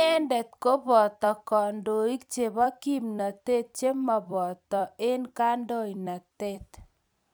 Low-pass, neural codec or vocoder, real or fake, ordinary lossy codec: none; vocoder, 44.1 kHz, 128 mel bands every 256 samples, BigVGAN v2; fake; none